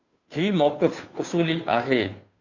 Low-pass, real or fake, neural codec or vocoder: 7.2 kHz; fake; codec, 16 kHz, 2 kbps, FunCodec, trained on Chinese and English, 25 frames a second